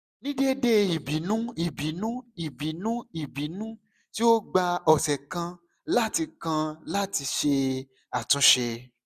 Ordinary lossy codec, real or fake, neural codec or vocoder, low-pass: none; real; none; 14.4 kHz